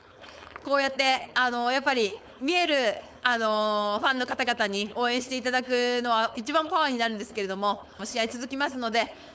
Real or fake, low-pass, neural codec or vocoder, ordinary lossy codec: fake; none; codec, 16 kHz, 4.8 kbps, FACodec; none